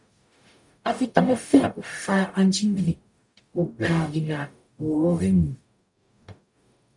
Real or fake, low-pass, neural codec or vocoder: fake; 10.8 kHz; codec, 44.1 kHz, 0.9 kbps, DAC